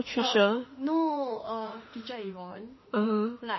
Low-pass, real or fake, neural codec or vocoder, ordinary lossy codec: 7.2 kHz; fake; autoencoder, 48 kHz, 32 numbers a frame, DAC-VAE, trained on Japanese speech; MP3, 24 kbps